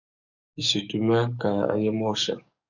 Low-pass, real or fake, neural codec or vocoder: 7.2 kHz; fake; codec, 44.1 kHz, 7.8 kbps, DAC